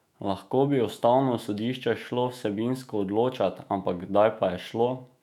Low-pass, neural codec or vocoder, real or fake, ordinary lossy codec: 19.8 kHz; autoencoder, 48 kHz, 128 numbers a frame, DAC-VAE, trained on Japanese speech; fake; none